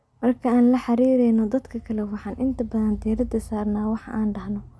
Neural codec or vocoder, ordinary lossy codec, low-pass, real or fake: none; none; none; real